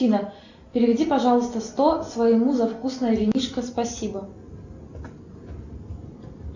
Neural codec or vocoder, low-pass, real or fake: none; 7.2 kHz; real